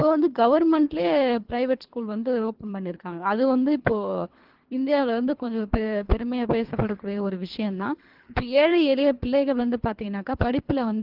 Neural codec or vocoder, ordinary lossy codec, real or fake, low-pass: codec, 24 kHz, 3 kbps, HILCodec; Opus, 16 kbps; fake; 5.4 kHz